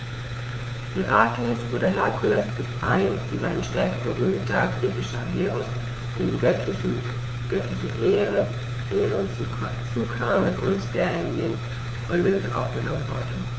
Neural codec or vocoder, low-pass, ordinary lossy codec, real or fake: codec, 16 kHz, 4 kbps, FunCodec, trained on LibriTTS, 50 frames a second; none; none; fake